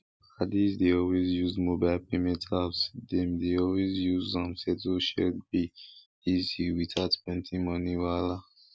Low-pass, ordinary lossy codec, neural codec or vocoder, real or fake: none; none; none; real